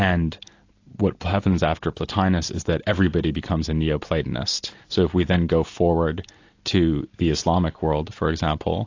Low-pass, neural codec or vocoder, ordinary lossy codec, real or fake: 7.2 kHz; none; AAC, 48 kbps; real